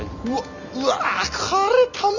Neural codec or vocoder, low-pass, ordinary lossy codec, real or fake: none; 7.2 kHz; none; real